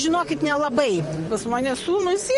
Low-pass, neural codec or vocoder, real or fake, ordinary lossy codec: 14.4 kHz; vocoder, 44.1 kHz, 128 mel bands, Pupu-Vocoder; fake; MP3, 48 kbps